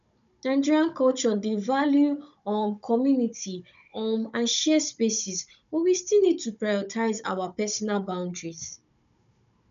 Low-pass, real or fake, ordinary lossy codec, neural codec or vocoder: 7.2 kHz; fake; none; codec, 16 kHz, 16 kbps, FunCodec, trained on Chinese and English, 50 frames a second